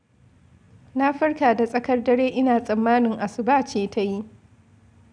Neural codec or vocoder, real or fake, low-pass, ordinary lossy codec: none; real; 9.9 kHz; none